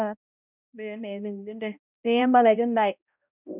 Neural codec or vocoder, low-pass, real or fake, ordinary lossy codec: codec, 16 kHz, 0.5 kbps, X-Codec, HuBERT features, trained on balanced general audio; 3.6 kHz; fake; none